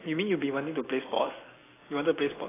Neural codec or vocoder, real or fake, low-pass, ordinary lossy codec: none; real; 3.6 kHz; AAC, 16 kbps